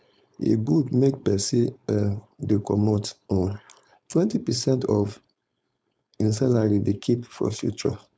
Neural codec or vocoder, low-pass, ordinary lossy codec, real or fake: codec, 16 kHz, 4.8 kbps, FACodec; none; none; fake